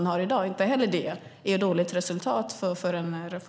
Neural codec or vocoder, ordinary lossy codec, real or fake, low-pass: none; none; real; none